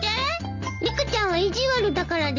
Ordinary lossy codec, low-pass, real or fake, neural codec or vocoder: none; 7.2 kHz; real; none